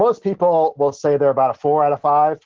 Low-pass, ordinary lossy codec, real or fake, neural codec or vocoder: 7.2 kHz; Opus, 16 kbps; real; none